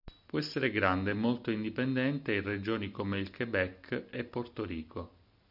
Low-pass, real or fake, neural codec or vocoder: 5.4 kHz; real; none